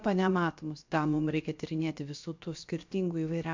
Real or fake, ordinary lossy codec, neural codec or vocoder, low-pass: fake; MP3, 64 kbps; codec, 16 kHz, about 1 kbps, DyCAST, with the encoder's durations; 7.2 kHz